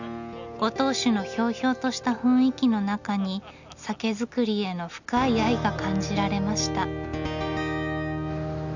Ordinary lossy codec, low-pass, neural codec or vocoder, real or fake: none; 7.2 kHz; none; real